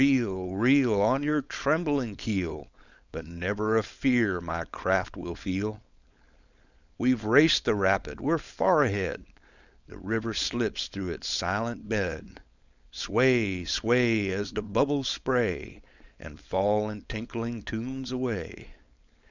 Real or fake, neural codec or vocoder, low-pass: fake; codec, 16 kHz, 4.8 kbps, FACodec; 7.2 kHz